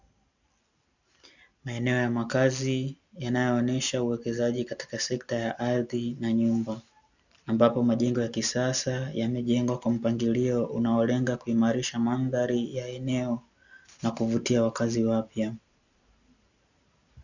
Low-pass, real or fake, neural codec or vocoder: 7.2 kHz; real; none